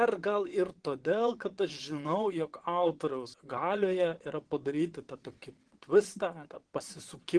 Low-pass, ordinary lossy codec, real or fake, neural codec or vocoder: 9.9 kHz; Opus, 16 kbps; fake; vocoder, 22.05 kHz, 80 mel bands, WaveNeXt